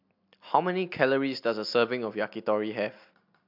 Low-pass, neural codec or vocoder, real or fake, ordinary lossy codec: 5.4 kHz; none; real; MP3, 48 kbps